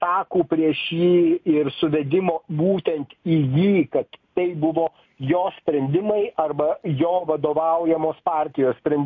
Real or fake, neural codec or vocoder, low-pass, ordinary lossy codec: real; none; 7.2 kHz; MP3, 48 kbps